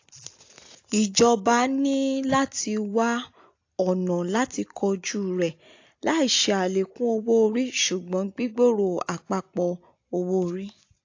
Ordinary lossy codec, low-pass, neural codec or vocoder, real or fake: AAC, 48 kbps; 7.2 kHz; none; real